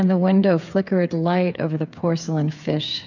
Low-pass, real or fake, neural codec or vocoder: 7.2 kHz; fake; codec, 16 kHz, 8 kbps, FreqCodec, smaller model